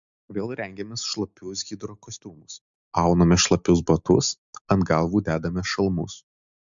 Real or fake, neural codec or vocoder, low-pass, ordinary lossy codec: real; none; 7.2 kHz; MP3, 64 kbps